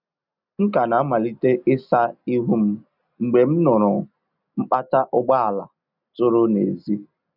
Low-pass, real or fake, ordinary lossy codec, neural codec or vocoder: 5.4 kHz; real; none; none